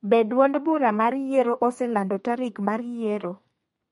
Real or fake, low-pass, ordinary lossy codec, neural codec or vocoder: fake; 14.4 kHz; MP3, 48 kbps; codec, 32 kHz, 1.9 kbps, SNAC